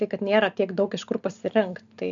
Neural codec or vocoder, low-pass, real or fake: none; 7.2 kHz; real